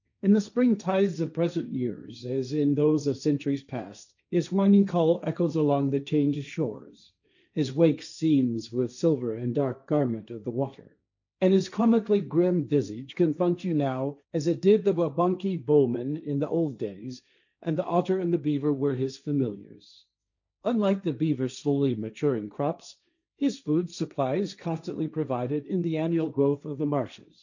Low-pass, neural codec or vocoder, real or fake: 7.2 kHz; codec, 16 kHz, 1.1 kbps, Voila-Tokenizer; fake